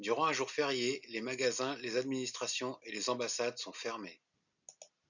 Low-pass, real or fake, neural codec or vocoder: 7.2 kHz; real; none